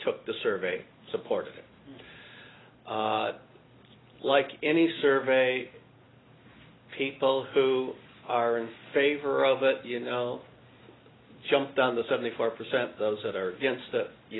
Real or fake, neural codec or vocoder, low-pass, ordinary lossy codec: real; none; 7.2 kHz; AAC, 16 kbps